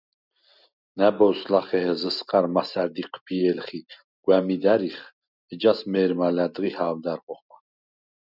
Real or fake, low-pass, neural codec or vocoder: real; 5.4 kHz; none